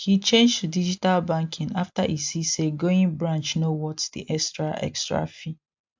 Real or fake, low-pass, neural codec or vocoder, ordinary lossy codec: real; 7.2 kHz; none; MP3, 64 kbps